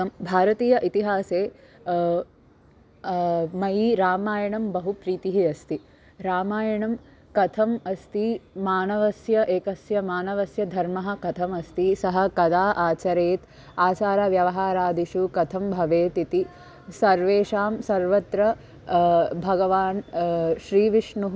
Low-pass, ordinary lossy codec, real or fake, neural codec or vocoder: none; none; real; none